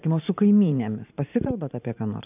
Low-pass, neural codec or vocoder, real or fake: 3.6 kHz; vocoder, 44.1 kHz, 128 mel bands every 512 samples, BigVGAN v2; fake